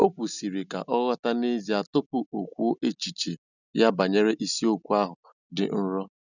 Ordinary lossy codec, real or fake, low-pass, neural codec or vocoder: none; real; 7.2 kHz; none